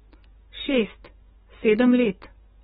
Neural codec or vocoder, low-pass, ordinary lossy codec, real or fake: codec, 44.1 kHz, 7.8 kbps, DAC; 19.8 kHz; AAC, 16 kbps; fake